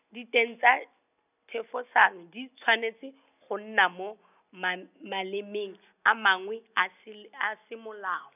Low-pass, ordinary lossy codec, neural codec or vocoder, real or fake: 3.6 kHz; none; none; real